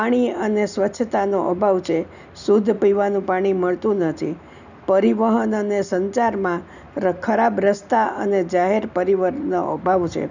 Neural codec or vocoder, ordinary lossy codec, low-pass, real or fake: none; none; 7.2 kHz; real